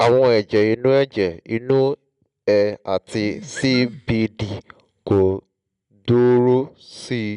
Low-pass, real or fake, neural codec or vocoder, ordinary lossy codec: 10.8 kHz; real; none; none